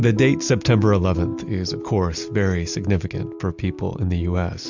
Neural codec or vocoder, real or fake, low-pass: none; real; 7.2 kHz